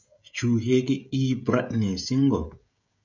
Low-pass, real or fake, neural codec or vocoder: 7.2 kHz; fake; codec, 16 kHz, 16 kbps, FreqCodec, smaller model